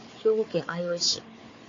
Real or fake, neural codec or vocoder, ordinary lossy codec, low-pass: fake; codec, 16 kHz, 4 kbps, FunCodec, trained on Chinese and English, 50 frames a second; AAC, 32 kbps; 7.2 kHz